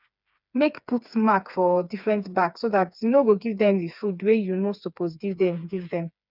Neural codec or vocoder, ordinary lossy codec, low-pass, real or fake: codec, 16 kHz, 4 kbps, FreqCodec, smaller model; none; 5.4 kHz; fake